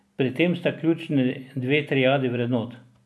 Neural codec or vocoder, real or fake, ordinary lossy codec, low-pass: none; real; none; none